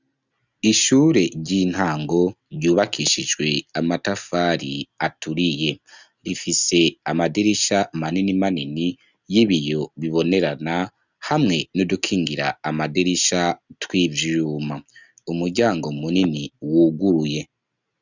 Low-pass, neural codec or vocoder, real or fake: 7.2 kHz; none; real